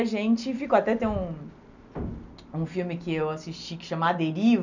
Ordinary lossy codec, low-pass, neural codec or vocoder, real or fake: none; 7.2 kHz; none; real